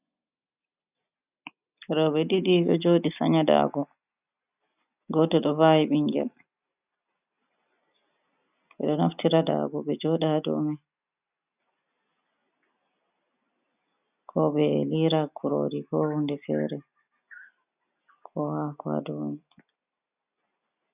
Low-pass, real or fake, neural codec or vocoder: 3.6 kHz; real; none